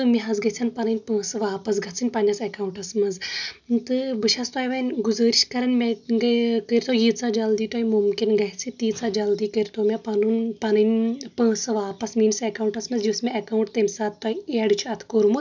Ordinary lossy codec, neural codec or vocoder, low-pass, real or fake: none; none; 7.2 kHz; real